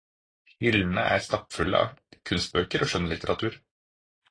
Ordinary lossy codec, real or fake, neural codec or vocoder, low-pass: AAC, 32 kbps; real; none; 9.9 kHz